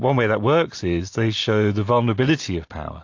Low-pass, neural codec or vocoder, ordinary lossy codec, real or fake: 7.2 kHz; none; AAC, 48 kbps; real